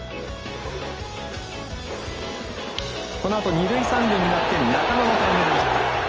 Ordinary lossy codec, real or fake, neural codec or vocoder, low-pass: Opus, 24 kbps; real; none; 7.2 kHz